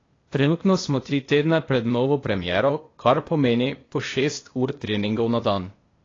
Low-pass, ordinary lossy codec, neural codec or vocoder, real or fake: 7.2 kHz; AAC, 32 kbps; codec, 16 kHz, 0.8 kbps, ZipCodec; fake